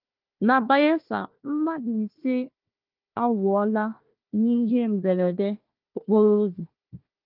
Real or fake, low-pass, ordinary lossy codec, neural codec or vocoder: fake; 5.4 kHz; Opus, 32 kbps; codec, 16 kHz, 1 kbps, FunCodec, trained on Chinese and English, 50 frames a second